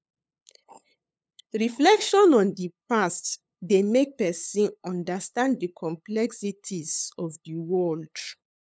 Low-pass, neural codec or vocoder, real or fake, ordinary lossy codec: none; codec, 16 kHz, 8 kbps, FunCodec, trained on LibriTTS, 25 frames a second; fake; none